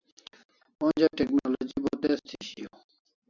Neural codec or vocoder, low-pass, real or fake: none; 7.2 kHz; real